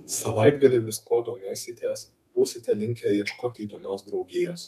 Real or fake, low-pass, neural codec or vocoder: fake; 14.4 kHz; codec, 32 kHz, 1.9 kbps, SNAC